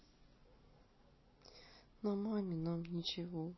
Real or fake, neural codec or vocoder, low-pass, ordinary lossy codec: real; none; 7.2 kHz; MP3, 24 kbps